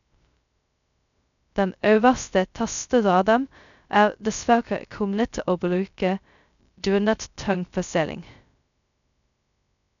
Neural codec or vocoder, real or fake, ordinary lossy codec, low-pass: codec, 16 kHz, 0.2 kbps, FocalCodec; fake; none; 7.2 kHz